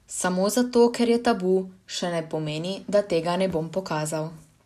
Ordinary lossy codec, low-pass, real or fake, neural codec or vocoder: none; 14.4 kHz; real; none